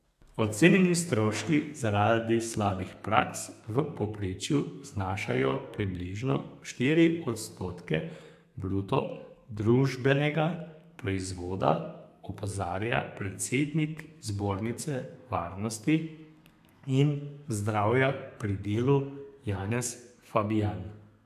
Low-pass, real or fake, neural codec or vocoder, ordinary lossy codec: 14.4 kHz; fake; codec, 32 kHz, 1.9 kbps, SNAC; AAC, 96 kbps